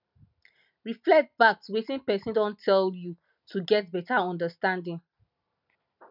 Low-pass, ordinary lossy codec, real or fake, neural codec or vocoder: 5.4 kHz; none; real; none